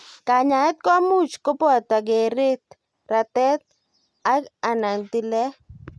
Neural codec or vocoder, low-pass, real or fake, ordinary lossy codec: none; none; real; none